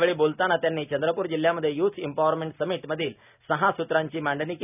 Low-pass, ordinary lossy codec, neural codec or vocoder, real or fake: 3.6 kHz; none; none; real